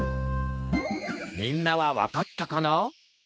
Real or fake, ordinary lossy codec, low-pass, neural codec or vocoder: fake; none; none; codec, 16 kHz, 2 kbps, X-Codec, HuBERT features, trained on general audio